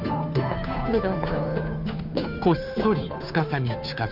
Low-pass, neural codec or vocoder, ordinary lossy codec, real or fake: 5.4 kHz; codec, 16 kHz, 2 kbps, FunCodec, trained on Chinese and English, 25 frames a second; none; fake